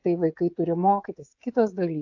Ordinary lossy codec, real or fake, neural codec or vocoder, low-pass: AAC, 48 kbps; fake; codec, 16 kHz, 16 kbps, FreqCodec, smaller model; 7.2 kHz